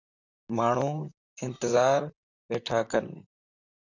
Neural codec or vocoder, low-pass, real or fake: vocoder, 44.1 kHz, 128 mel bands, Pupu-Vocoder; 7.2 kHz; fake